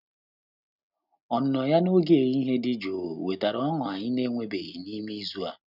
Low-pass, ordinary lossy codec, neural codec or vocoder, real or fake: 5.4 kHz; none; none; real